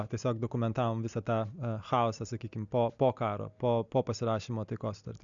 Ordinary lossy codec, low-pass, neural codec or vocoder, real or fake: MP3, 96 kbps; 7.2 kHz; none; real